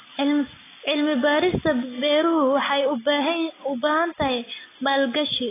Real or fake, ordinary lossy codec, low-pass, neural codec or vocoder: real; AAC, 16 kbps; 3.6 kHz; none